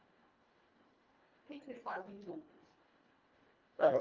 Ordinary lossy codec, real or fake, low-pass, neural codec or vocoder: Opus, 24 kbps; fake; 7.2 kHz; codec, 24 kHz, 1.5 kbps, HILCodec